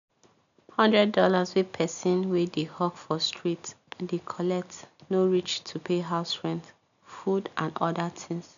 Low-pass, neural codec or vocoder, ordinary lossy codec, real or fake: 7.2 kHz; none; none; real